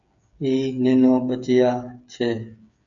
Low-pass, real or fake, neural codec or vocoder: 7.2 kHz; fake; codec, 16 kHz, 8 kbps, FreqCodec, smaller model